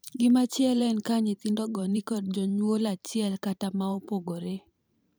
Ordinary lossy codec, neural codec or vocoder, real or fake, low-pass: none; vocoder, 44.1 kHz, 128 mel bands every 512 samples, BigVGAN v2; fake; none